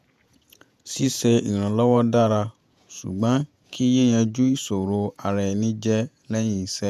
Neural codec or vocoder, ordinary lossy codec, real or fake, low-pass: vocoder, 48 kHz, 128 mel bands, Vocos; none; fake; 14.4 kHz